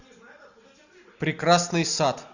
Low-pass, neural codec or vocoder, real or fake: 7.2 kHz; none; real